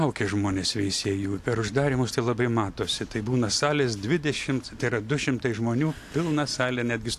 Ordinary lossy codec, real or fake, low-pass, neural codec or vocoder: AAC, 64 kbps; real; 14.4 kHz; none